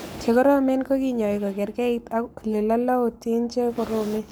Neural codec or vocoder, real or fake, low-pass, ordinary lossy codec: codec, 44.1 kHz, 7.8 kbps, Pupu-Codec; fake; none; none